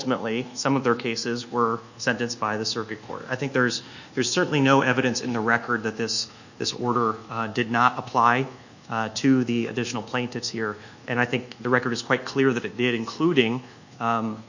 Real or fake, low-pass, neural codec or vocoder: fake; 7.2 kHz; codec, 24 kHz, 1.2 kbps, DualCodec